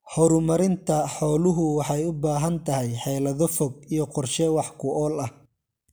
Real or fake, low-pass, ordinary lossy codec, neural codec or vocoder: real; none; none; none